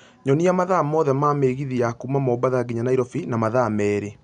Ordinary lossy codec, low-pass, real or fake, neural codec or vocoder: none; 9.9 kHz; real; none